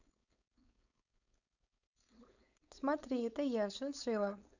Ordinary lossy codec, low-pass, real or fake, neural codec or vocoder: none; 7.2 kHz; fake; codec, 16 kHz, 4.8 kbps, FACodec